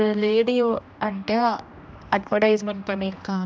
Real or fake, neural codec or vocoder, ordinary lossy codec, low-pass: fake; codec, 16 kHz, 1 kbps, X-Codec, HuBERT features, trained on general audio; none; none